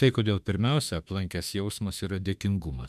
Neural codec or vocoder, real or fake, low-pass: autoencoder, 48 kHz, 32 numbers a frame, DAC-VAE, trained on Japanese speech; fake; 14.4 kHz